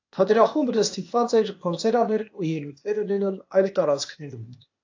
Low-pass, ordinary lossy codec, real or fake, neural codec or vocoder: 7.2 kHz; AAC, 48 kbps; fake; codec, 16 kHz, 0.8 kbps, ZipCodec